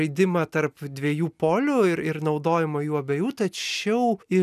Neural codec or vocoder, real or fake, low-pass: none; real; 14.4 kHz